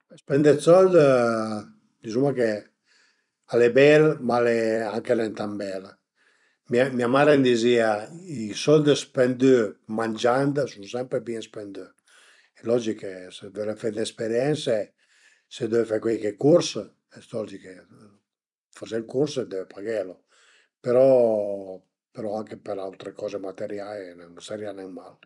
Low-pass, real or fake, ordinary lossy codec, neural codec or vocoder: 10.8 kHz; fake; none; vocoder, 44.1 kHz, 128 mel bands every 256 samples, BigVGAN v2